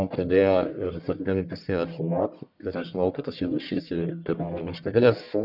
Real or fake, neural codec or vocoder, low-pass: fake; codec, 44.1 kHz, 1.7 kbps, Pupu-Codec; 5.4 kHz